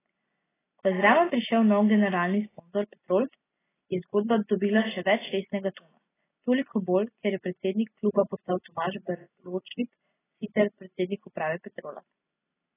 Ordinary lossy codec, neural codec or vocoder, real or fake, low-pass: AAC, 16 kbps; none; real; 3.6 kHz